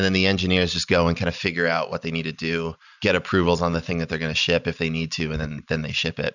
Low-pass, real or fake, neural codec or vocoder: 7.2 kHz; real; none